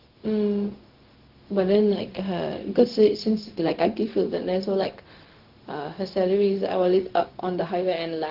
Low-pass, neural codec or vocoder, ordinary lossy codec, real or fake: 5.4 kHz; codec, 16 kHz, 0.4 kbps, LongCat-Audio-Codec; Opus, 16 kbps; fake